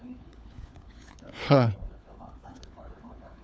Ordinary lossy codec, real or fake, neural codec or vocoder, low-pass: none; fake; codec, 16 kHz, 4 kbps, FunCodec, trained on LibriTTS, 50 frames a second; none